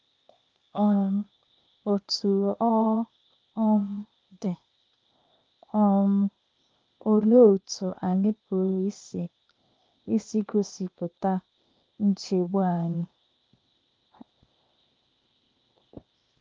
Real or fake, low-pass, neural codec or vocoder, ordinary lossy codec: fake; 7.2 kHz; codec, 16 kHz, 0.8 kbps, ZipCodec; Opus, 24 kbps